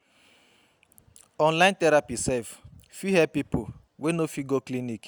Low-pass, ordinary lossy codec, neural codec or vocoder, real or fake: none; none; none; real